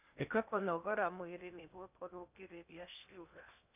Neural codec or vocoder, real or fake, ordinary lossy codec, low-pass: codec, 16 kHz in and 24 kHz out, 0.8 kbps, FocalCodec, streaming, 65536 codes; fake; none; 3.6 kHz